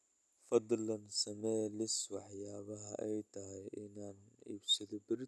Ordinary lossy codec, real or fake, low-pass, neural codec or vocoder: none; real; none; none